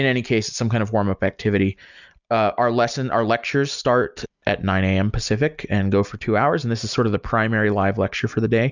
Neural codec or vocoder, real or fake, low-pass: none; real; 7.2 kHz